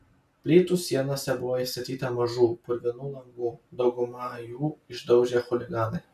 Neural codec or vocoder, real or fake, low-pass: none; real; 14.4 kHz